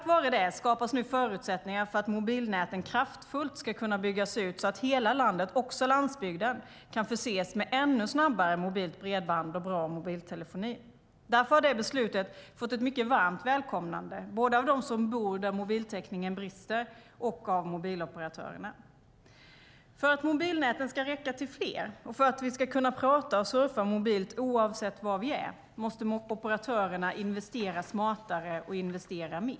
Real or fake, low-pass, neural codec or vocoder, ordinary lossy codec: real; none; none; none